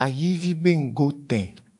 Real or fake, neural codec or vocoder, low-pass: fake; autoencoder, 48 kHz, 32 numbers a frame, DAC-VAE, trained on Japanese speech; 10.8 kHz